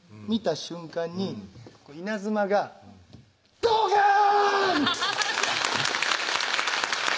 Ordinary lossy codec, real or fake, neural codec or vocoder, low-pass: none; real; none; none